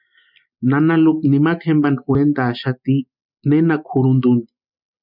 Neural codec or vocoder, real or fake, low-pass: none; real; 5.4 kHz